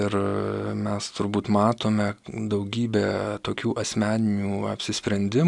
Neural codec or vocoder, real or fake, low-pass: none; real; 10.8 kHz